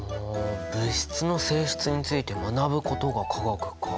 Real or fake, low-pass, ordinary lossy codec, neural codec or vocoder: real; none; none; none